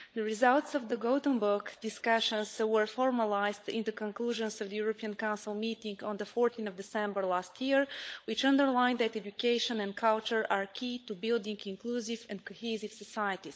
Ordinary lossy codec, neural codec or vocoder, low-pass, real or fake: none; codec, 16 kHz, 16 kbps, FunCodec, trained on LibriTTS, 50 frames a second; none; fake